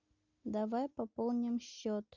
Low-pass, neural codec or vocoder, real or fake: 7.2 kHz; none; real